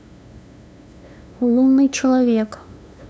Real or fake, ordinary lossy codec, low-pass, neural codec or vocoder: fake; none; none; codec, 16 kHz, 2 kbps, FunCodec, trained on LibriTTS, 25 frames a second